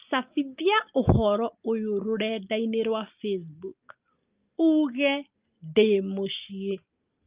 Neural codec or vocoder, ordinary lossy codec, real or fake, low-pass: none; Opus, 32 kbps; real; 3.6 kHz